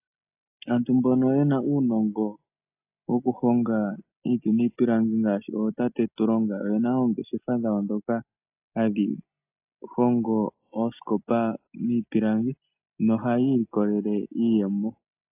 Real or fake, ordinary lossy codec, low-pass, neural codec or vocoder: real; AAC, 32 kbps; 3.6 kHz; none